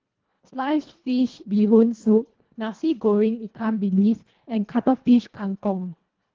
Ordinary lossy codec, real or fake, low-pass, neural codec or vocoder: Opus, 16 kbps; fake; 7.2 kHz; codec, 24 kHz, 1.5 kbps, HILCodec